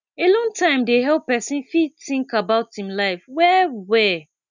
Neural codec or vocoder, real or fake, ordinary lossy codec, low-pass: none; real; none; 7.2 kHz